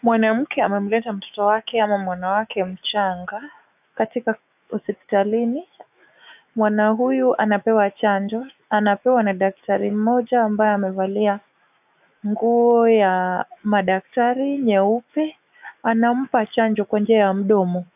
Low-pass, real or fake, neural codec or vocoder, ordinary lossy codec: 3.6 kHz; fake; autoencoder, 48 kHz, 128 numbers a frame, DAC-VAE, trained on Japanese speech; AAC, 32 kbps